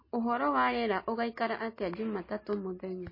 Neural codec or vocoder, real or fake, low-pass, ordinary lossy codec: none; real; 5.4 kHz; MP3, 24 kbps